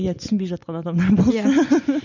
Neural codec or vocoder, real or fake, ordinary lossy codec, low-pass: none; real; none; 7.2 kHz